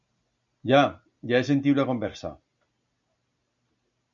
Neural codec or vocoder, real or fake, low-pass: none; real; 7.2 kHz